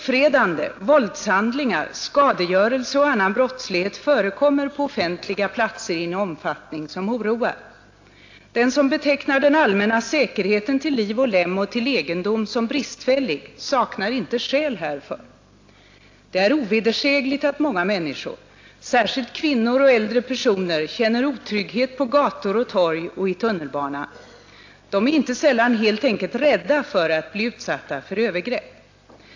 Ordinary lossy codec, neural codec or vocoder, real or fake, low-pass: AAC, 48 kbps; none; real; 7.2 kHz